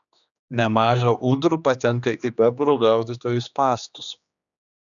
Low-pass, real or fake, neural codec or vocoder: 7.2 kHz; fake; codec, 16 kHz, 2 kbps, X-Codec, HuBERT features, trained on general audio